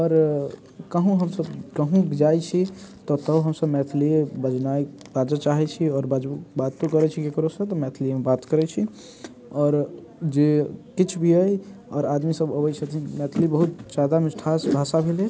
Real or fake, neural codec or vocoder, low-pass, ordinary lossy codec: real; none; none; none